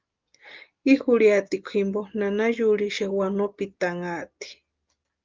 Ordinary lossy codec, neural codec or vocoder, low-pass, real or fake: Opus, 32 kbps; none; 7.2 kHz; real